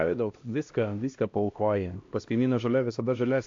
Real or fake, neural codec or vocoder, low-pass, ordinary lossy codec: fake; codec, 16 kHz, 1 kbps, X-Codec, HuBERT features, trained on LibriSpeech; 7.2 kHz; AAC, 48 kbps